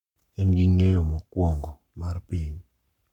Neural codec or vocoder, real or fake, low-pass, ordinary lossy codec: codec, 44.1 kHz, 7.8 kbps, Pupu-Codec; fake; 19.8 kHz; none